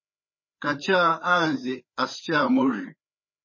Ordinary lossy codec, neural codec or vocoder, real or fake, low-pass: MP3, 32 kbps; codec, 16 kHz, 4 kbps, FreqCodec, larger model; fake; 7.2 kHz